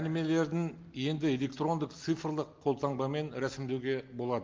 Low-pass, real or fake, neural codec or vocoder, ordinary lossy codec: 7.2 kHz; real; none; Opus, 32 kbps